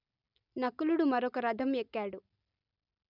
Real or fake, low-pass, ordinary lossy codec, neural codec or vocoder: real; 5.4 kHz; none; none